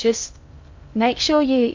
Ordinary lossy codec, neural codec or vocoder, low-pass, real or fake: AAC, 48 kbps; codec, 16 kHz in and 24 kHz out, 0.6 kbps, FocalCodec, streaming, 2048 codes; 7.2 kHz; fake